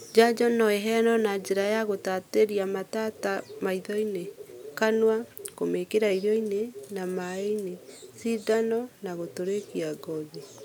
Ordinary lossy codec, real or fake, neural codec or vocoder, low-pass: none; real; none; none